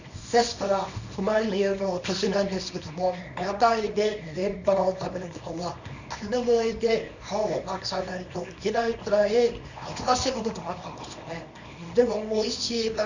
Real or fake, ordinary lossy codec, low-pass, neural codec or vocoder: fake; none; 7.2 kHz; codec, 24 kHz, 0.9 kbps, WavTokenizer, small release